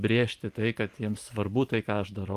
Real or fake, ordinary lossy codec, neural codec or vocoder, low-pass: fake; Opus, 32 kbps; vocoder, 44.1 kHz, 128 mel bands every 512 samples, BigVGAN v2; 14.4 kHz